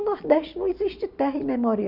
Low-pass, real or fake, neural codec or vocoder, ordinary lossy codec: 5.4 kHz; real; none; none